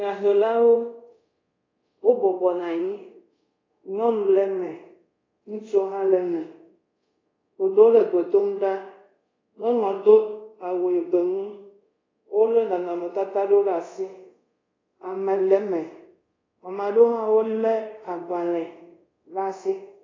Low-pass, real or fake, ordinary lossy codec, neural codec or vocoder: 7.2 kHz; fake; AAC, 32 kbps; codec, 24 kHz, 0.5 kbps, DualCodec